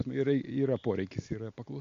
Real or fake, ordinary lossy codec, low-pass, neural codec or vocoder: real; MP3, 64 kbps; 7.2 kHz; none